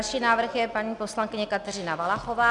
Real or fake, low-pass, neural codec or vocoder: real; 10.8 kHz; none